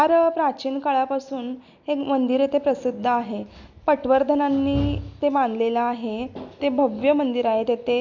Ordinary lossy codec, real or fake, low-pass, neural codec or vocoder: none; real; 7.2 kHz; none